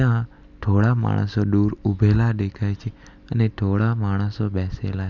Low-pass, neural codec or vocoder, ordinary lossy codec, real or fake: 7.2 kHz; none; none; real